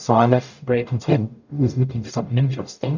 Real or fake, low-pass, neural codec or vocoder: fake; 7.2 kHz; codec, 44.1 kHz, 0.9 kbps, DAC